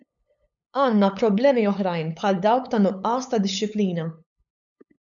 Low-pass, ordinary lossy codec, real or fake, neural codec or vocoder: 7.2 kHz; MP3, 96 kbps; fake; codec, 16 kHz, 8 kbps, FunCodec, trained on LibriTTS, 25 frames a second